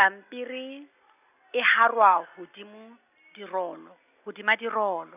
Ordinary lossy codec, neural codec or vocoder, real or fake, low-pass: none; none; real; 3.6 kHz